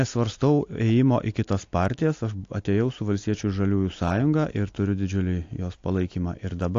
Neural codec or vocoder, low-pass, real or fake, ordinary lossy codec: none; 7.2 kHz; real; AAC, 48 kbps